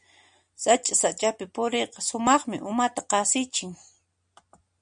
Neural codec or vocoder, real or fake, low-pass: none; real; 9.9 kHz